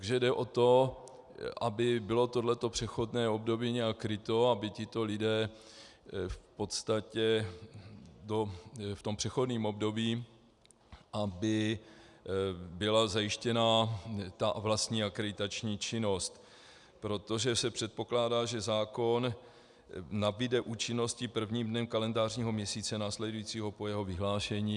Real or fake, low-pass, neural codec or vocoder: real; 10.8 kHz; none